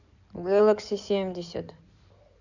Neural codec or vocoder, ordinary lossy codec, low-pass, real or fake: codec, 16 kHz in and 24 kHz out, 2.2 kbps, FireRedTTS-2 codec; none; 7.2 kHz; fake